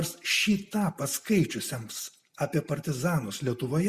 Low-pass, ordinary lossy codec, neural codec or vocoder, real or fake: 14.4 kHz; Opus, 64 kbps; none; real